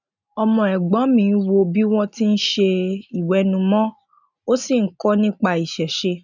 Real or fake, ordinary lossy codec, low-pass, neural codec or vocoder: real; none; 7.2 kHz; none